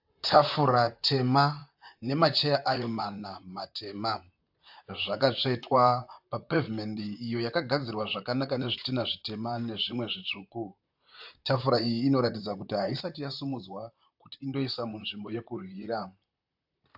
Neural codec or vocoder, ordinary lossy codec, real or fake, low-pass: vocoder, 44.1 kHz, 128 mel bands, Pupu-Vocoder; AAC, 48 kbps; fake; 5.4 kHz